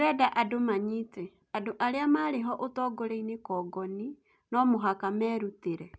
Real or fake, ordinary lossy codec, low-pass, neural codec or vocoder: real; none; none; none